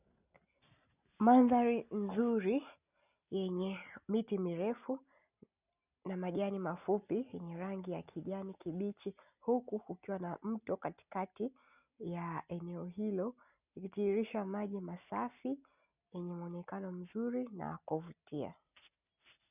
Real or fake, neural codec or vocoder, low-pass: real; none; 3.6 kHz